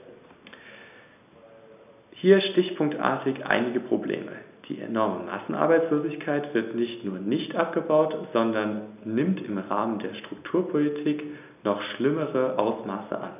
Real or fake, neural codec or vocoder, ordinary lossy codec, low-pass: real; none; none; 3.6 kHz